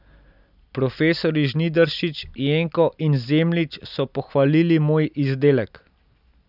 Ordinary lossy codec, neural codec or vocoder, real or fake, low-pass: none; none; real; 5.4 kHz